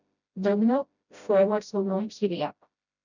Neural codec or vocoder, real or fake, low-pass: codec, 16 kHz, 0.5 kbps, FreqCodec, smaller model; fake; 7.2 kHz